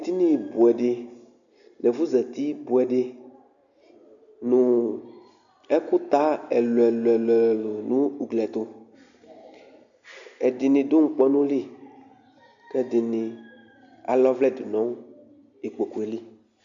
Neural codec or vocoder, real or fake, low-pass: none; real; 7.2 kHz